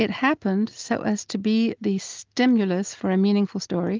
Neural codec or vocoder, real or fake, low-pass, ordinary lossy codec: none; real; 7.2 kHz; Opus, 32 kbps